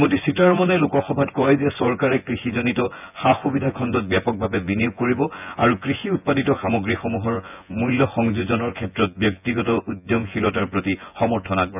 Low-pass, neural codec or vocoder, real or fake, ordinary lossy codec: 3.6 kHz; vocoder, 24 kHz, 100 mel bands, Vocos; fake; none